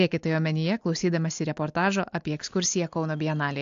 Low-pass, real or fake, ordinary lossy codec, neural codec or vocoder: 7.2 kHz; real; AAC, 64 kbps; none